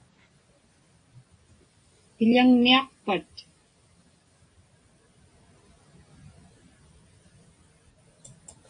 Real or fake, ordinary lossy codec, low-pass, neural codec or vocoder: real; AAC, 48 kbps; 9.9 kHz; none